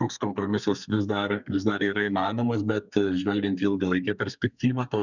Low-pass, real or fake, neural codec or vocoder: 7.2 kHz; fake; codec, 32 kHz, 1.9 kbps, SNAC